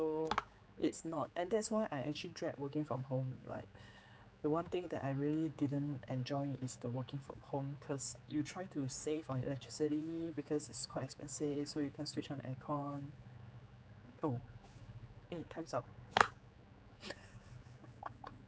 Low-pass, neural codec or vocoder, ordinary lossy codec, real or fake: none; codec, 16 kHz, 4 kbps, X-Codec, HuBERT features, trained on general audio; none; fake